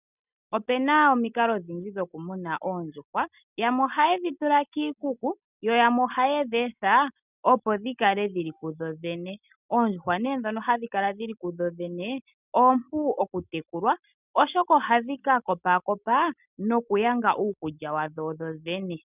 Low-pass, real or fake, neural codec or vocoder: 3.6 kHz; real; none